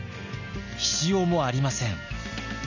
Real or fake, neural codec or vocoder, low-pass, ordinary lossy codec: real; none; 7.2 kHz; none